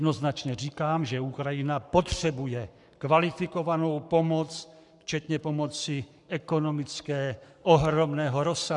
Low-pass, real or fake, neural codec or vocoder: 10.8 kHz; fake; codec, 44.1 kHz, 7.8 kbps, Pupu-Codec